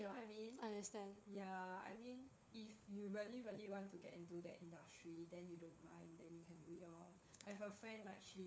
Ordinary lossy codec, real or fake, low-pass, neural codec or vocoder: none; fake; none; codec, 16 kHz, 4 kbps, FunCodec, trained on LibriTTS, 50 frames a second